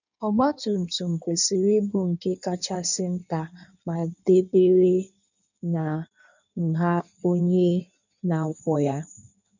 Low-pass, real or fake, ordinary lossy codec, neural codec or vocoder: 7.2 kHz; fake; none; codec, 16 kHz in and 24 kHz out, 1.1 kbps, FireRedTTS-2 codec